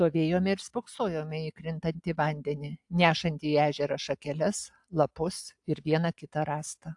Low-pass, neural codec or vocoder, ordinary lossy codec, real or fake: 10.8 kHz; none; AAC, 64 kbps; real